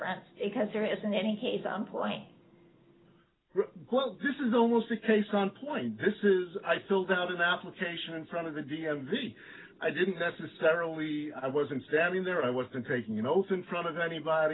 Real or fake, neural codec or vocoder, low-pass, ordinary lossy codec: real; none; 7.2 kHz; AAC, 16 kbps